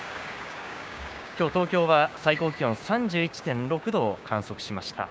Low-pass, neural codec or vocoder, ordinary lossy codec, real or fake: none; codec, 16 kHz, 6 kbps, DAC; none; fake